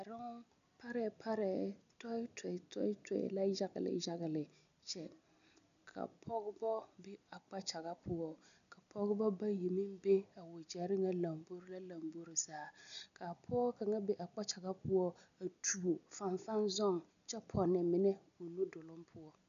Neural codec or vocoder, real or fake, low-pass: none; real; 7.2 kHz